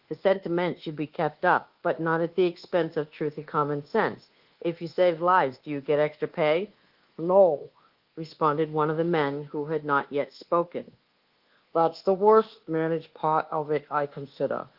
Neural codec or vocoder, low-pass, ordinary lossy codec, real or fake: codec, 24 kHz, 1.2 kbps, DualCodec; 5.4 kHz; Opus, 16 kbps; fake